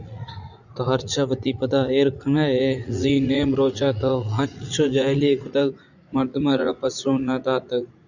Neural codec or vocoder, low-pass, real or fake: vocoder, 44.1 kHz, 80 mel bands, Vocos; 7.2 kHz; fake